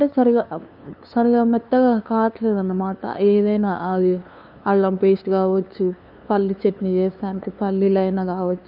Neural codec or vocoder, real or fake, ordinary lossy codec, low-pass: codec, 16 kHz, 2 kbps, FunCodec, trained on LibriTTS, 25 frames a second; fake; none; 5.4 kHz